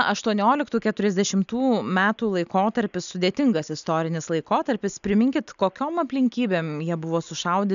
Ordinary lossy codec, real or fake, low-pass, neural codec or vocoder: MP3, 96 kbps; real; 7.2 kHz; none